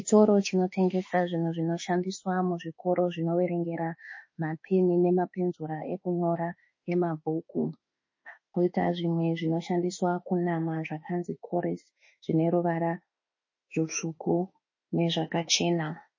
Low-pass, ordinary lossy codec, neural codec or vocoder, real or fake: 7.2 kHz; MP3, 32 kbps; autoencoder, 48 kHz, 32 numbers a frame, DAC-VAE, trained on Japanese speech; fake